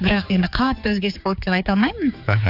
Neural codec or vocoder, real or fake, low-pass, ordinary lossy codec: codec, 16 kHz, 4 kbps, X-Codec, HuBERT features, trained on general audio; fake; 5.4 kHz; AAC, 48 kbps